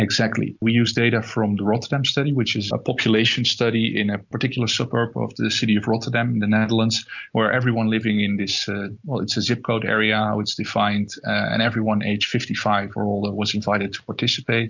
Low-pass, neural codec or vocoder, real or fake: 7.2 kHz; none; real